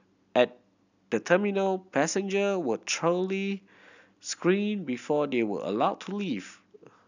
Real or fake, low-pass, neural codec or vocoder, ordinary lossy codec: real; 7.2 kHz; none; none